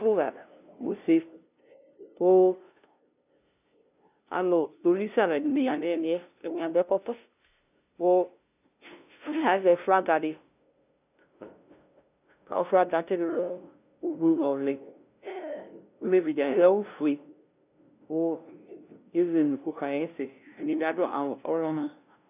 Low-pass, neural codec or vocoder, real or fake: 3.6 kHz; codec, 16 kHz, 0.5 kbps, FunCodec, trained on LibriTTS, 25 frames a second; fake